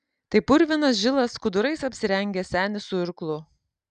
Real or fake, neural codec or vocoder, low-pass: real; none; 9.9 kHz